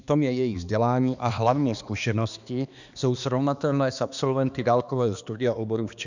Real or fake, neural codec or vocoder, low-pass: fake; codec, 16 kHz, 2 kbps, X-Codec, HuBERT features, trained on balanced general audio; 7.2 kHz